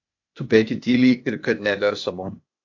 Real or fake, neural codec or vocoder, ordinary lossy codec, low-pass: fake; codec, 16 kHz, 0.8 kbps, ZipCodec; AAC, 48 kbps; 7.2 kHz